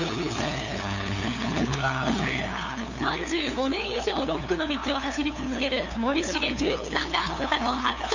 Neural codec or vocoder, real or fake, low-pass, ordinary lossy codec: codec, 16 kHz, 2 kbps, FunCodec, trained on LibriTTS, 25 frames a second; fake; 7.2 kHz; none